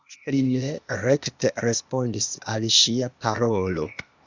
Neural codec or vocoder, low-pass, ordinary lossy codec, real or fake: codec, 16 kHz, 0.8 kbps, ZipCodec; 7.2 kHz; Opus, 64 kbps; fake